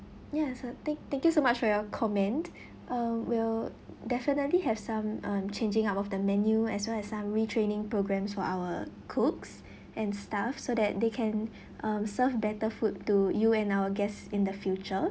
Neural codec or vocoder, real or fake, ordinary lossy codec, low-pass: none; real; none; none